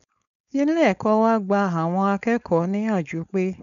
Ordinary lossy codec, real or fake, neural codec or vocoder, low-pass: none; fake; codec, 16 kHz, 4.8 kbps, FACodec; 7.2 kHz